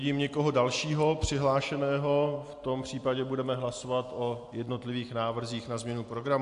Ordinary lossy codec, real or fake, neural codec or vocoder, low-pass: AAC, 64 kbps; real; none; 10.8 kHz